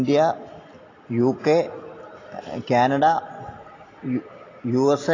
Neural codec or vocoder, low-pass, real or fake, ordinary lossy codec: none; 7.2 kHz; real; AAC, 32 kbps